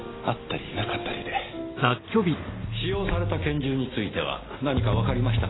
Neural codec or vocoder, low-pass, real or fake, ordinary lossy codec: none; 7.2 kHz; real; AAC, 16 kbps